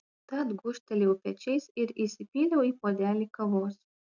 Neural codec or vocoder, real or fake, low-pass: none; real; 7.2 kHz